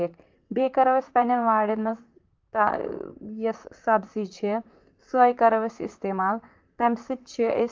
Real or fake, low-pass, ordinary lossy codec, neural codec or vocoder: fake; 7.2 kHz; Opus, 32 kbps; vocoder, 44.1 kHz, 128 mel bands, Pupu-Vocoder